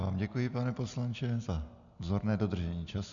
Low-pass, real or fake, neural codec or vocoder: 7.2 kHz; real; none